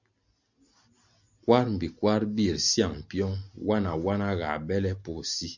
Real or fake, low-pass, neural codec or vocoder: real; 7.2 kHz; none